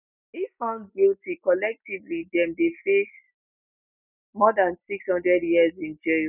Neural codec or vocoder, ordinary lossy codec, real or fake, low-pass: none; none; real; 3.6 kHz